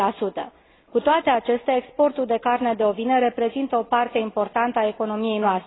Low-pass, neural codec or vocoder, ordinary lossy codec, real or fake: 7.2 kHz; none; AAC, 16 kbps; real